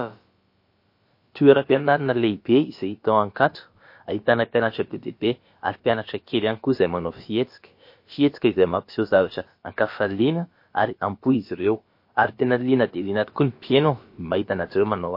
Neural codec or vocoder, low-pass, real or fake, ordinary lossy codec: codec, 16 kHz, about 1 kbps, DyCAST, with the encoder's durations; 5.4 kHz; fake; MP3, 32 kbps